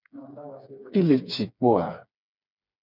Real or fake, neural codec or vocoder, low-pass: fake; codec, 16 kHz, 4 kbps, FreqCodec, smaller model; 5.4 kHz